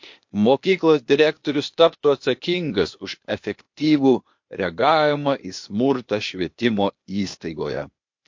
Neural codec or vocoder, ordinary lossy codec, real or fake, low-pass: codec, 16 kHz, 0.8 kbps, ZipCodec; MP3, 48 kbps; fake; 7.2 kHz